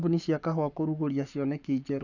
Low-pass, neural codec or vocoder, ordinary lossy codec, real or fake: 7.2 kHz; codec, 44.1 kHz, 7.8 kbps, Pupu-Codec; none; fake